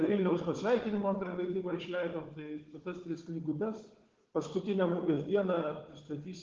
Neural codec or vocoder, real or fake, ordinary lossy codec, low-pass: codec, 16 kHz, 4 kbps, FunCodec, trained on Chinese and English, 50 frames a second; fake; Opus, 16 kbps; 7.2 kHz